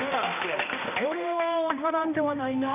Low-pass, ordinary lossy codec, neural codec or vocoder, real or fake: 3.6 kHz; none; codec, 16 kHz, 1 kbps, X-Codec, HuBERT features, trained on balanced general audio; fake